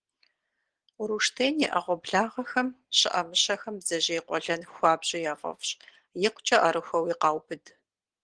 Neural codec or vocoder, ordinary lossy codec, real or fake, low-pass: none; Opus, 16 kbps; real; 9.9 kHz